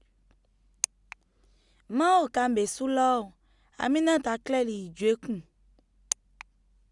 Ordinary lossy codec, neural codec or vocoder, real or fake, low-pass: Opus, 64 kbps; none; real; 10.8 kHz